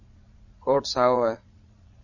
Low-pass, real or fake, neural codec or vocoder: 7.2 kHz; fake; vocoder, 22.05 kHz, 80 mel bands, Vocos